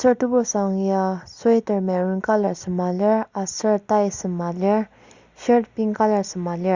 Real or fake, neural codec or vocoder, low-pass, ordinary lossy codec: real; none; 7.2 kHz; Opus, 64 kbps